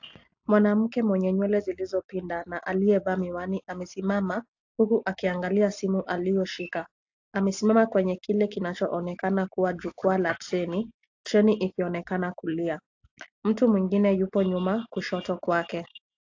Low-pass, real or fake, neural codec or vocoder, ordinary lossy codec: 7.2 kHz; real; none; AAC, 48 kbps